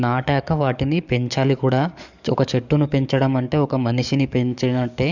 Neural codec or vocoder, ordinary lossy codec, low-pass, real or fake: codec, 44.1 kHz, 7.8 kbps, DAC; none; 7.2 kHz; fake